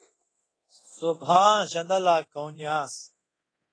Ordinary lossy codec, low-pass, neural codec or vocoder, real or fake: AAC, 32 kbps; 9.9 kHz; codec, 24 kHz, 0.9 kbps, DualCodec; fake